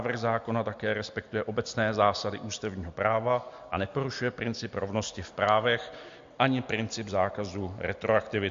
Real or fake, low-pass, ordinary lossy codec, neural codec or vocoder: real; 7.2 kHz; MP3, 48 kbps; none